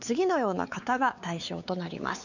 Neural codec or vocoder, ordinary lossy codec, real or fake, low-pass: codec, 16 kHz, 4 kbps, X-Codec, WavLM features, trained on Multilingual LibriSpeech; none; fake; 7.2 kHz